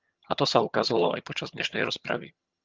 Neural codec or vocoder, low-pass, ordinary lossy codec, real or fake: vocoder, 22.05 kHz, 80 mel bands, HiFi-GAN; 7.2 kHz; Opus, 32 kbps; fake